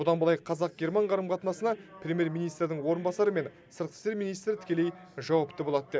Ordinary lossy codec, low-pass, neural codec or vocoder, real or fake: none; none; none; real